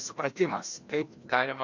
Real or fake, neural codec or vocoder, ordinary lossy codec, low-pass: fake; codec, 16 kHz, 1 kbps, FreqCodec, larger model; AAC, 48 kbps; 7.2 kHz